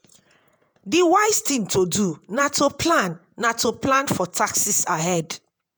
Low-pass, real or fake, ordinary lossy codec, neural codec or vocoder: none; fake; none; vocoder, 48 kHz, 128 mel bands, Vocos